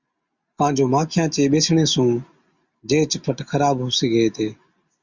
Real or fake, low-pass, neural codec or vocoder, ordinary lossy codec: real; 7.2 kHz; none; Opus, 64 kbps